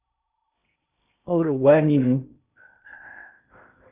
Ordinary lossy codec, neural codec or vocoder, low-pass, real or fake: Opus, 64 kbps; codec, 16 kHz in and 24 kHz out, 0.6 kbps, FocalCodec, streaming, 2048 codes; 3.6 kHz; fake